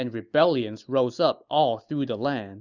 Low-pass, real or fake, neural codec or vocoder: 7.2 kHz; real; none